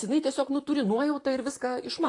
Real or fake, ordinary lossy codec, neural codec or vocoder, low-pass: fake; AAC, 48 kbps; vocoder, 44.1 kHz, 128 mel bands, Pupu-Vocoder; 10.8 kHz